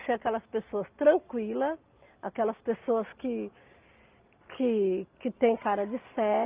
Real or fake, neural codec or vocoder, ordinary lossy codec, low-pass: real; none; Opus, 24 kbps; 3.6 kHz